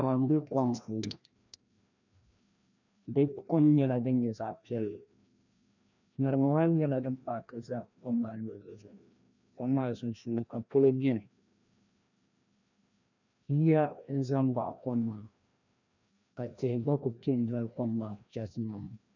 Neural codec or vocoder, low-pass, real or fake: codec, 16 kHz, 1 kbps, FreqCodec, larger model; 7.2 kHz; fake